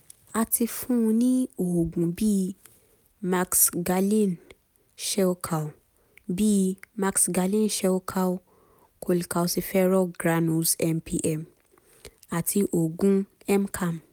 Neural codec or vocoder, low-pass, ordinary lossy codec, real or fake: none; none; none; real